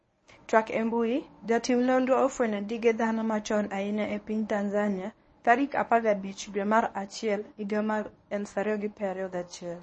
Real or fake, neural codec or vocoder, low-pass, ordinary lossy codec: fake; codec, 24 kHz, 0.9 kbps, WavTokenizer, medium speech release version 1; 10.8 kHz; MP3, 32 kbps